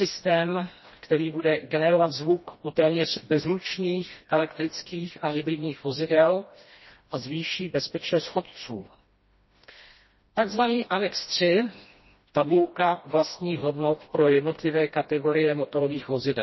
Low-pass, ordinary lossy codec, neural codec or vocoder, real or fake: 7.2 kHz; MP3, 24 kbps; codec, 16 kHz, 1 kbps, FreqCodec, smaller model; fake